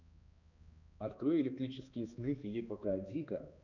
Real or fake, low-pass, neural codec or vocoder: fake; 7.2 kHz; codec, 16 kHz, 2 kbps, X-Codec, HuBERT features, trained on balanced general audio